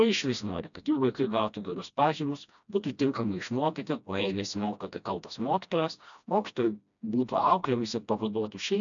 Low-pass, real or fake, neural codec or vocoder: 7.2 kHz; fake; codec, 16 kHz, 1 kbps, FreqCodec, smaller model